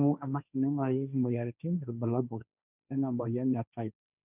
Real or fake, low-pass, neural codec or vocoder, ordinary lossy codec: fake; 3.6 kHz; codec, 16 kHz, 1.1 kbps, Voila-Tokenizer; none